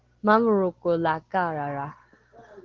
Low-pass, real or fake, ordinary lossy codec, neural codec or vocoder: 7.2 kHz; real; Opus, 16 kbps; none